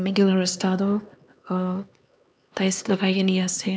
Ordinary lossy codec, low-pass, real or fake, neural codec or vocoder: none; none; fake; codec, 16 kHz, 2 kbps, X-Codec, HuBERT features, trained on LibriSpeech